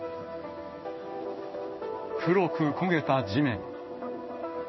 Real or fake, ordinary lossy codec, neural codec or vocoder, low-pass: fake; MP3, 24 kbps; codec, 16 kHz in and 24 kHz out, 1 kbps, XY-Tokenizer; 7.2 kHz